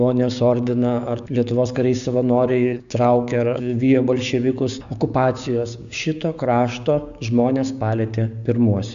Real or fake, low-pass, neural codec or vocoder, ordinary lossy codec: fake; 7.2 kHz; codec, 16 kHz, 6 kbps, DAC; AAC, 96 kbps